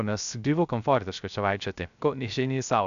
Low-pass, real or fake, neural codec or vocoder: 7.2 kHz; fake; codec, 16 kHz, 0.3 kbps, FocalCodec